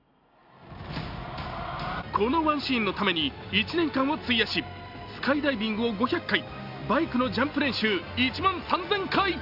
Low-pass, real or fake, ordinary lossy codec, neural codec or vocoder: 5.4 kHz; real; Opus, 64 kbps; none